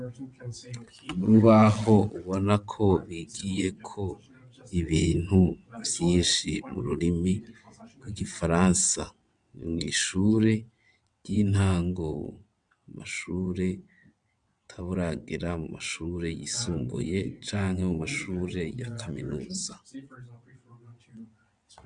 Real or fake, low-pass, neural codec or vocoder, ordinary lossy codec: fake; 9.9 kHz; vocoder, 22.05 kHz, 80 mel bands, WaveNeXt; MP3, 96 kbps